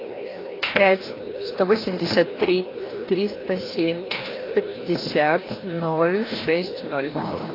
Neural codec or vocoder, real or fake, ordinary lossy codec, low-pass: codec, 16 kHz, 1 kbps, FreqCodec, larger model; fake; AAC, 24 kbps; 5.4 kHz